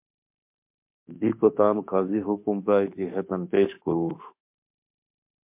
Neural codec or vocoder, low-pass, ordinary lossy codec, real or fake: autoencoder, 48 kHz, 32 numbers a frame, DAC-VAE, trained on Japanese speech; 3.6 kHz; MP3, 32 kbps; fake